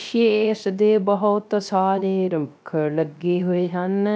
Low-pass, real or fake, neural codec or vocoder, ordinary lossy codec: none; fake; codec, 16 kHz, 0.3 kbps, FocalCodec; none